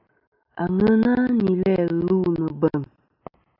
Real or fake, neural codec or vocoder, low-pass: real; none; 5.4 kHz